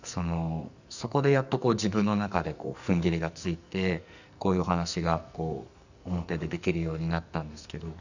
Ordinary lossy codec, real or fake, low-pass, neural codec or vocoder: none; fake; 7.2 kHz; codec, 44.1 kHz, 2.6 kbps, SNAC